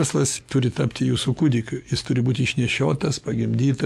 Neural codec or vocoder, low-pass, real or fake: codec, 44.1 kHz, 7.8 kbps, DAC; 14.4 kHz; fake